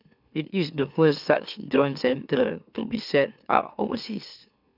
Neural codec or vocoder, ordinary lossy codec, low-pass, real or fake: autoencoder, 44.1 kHz, a latent of 192 numbers a frame, MeloTTS; none; 5.4 kHz; fake